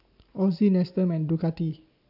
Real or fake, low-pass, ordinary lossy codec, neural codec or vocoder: real; 5.4 kHz; AAC, 48 kbps; none